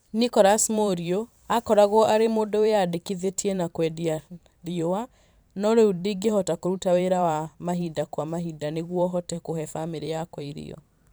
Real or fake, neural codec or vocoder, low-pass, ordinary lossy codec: fake; vocoder, 44.1 kHz, 128 mel bands every 512 samples, BigVGAN v2; none; none